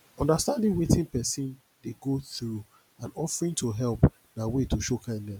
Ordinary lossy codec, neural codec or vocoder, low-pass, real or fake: none; none; none; real